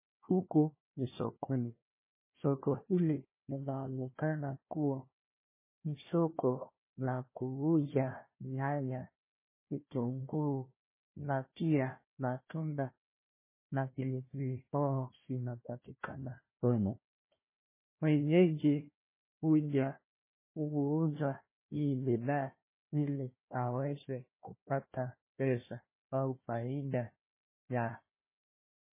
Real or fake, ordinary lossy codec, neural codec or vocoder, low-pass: fake; MP3, 16 kbps; codec, 16 kHz, 1 kbps, FunCodec, trained on Chinese and English, 50 frames a second; 3.6 kHz